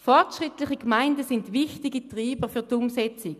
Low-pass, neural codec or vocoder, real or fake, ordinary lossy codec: 14.4 kHz; none; real; none